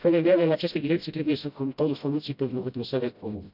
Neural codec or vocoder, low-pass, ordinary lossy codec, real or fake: codec, 16 kHz, 0.5 kbps, FreqCodec, smaller model; 5.4 kHz; none; fake